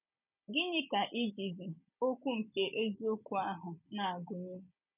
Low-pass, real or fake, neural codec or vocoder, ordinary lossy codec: 3.6 kHz; real; none; none